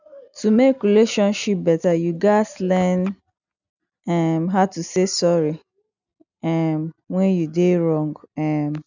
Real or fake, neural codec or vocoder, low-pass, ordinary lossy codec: real; none; 7.2 kHz; none